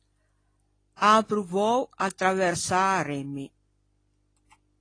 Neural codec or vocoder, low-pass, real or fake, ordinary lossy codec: none; 9.9 kHz; real; AAC, 32 kbps